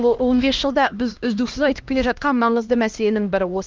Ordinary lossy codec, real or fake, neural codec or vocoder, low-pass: Opus, 32 kbps; fake; codec, 16 kHz, 1 kbps, X-Codec, HuBERT features, trained on LibriSpeech; 7.2 kHz